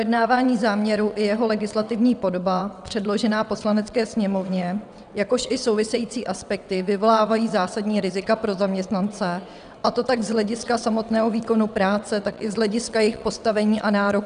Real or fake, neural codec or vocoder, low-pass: fake; vocoder, 22.05 kHz, 80 mel bands, WaveNeXt; 9.9 kHz